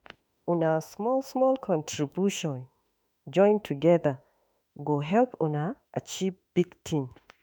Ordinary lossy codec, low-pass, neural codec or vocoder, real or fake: none; none; autoencoder, 48 kHz, 32 numbers a frame, DAC-VAE, trained on Japanese speech; fake